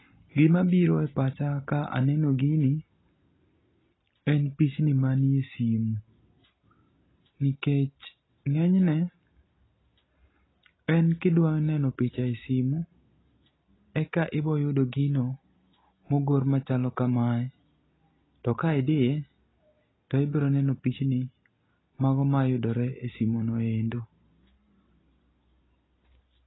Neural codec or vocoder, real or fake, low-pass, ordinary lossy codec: none; real; 7.2 kHz; AAC, 16 kbps